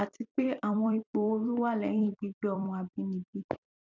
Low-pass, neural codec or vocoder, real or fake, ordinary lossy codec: 7.2 kHz; vocoder, 44.1 kHz, 128 mel bands every 256 samples, BigVGAN v2; fake; none